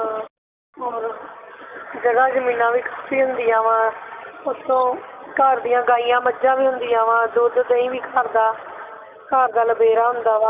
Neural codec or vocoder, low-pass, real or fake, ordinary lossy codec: none; 3.6 kHz; real; none